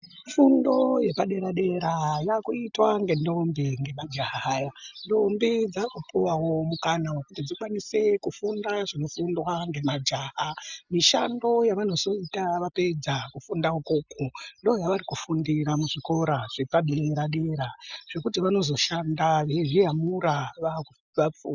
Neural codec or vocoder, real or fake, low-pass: none; real; 7.2 kHz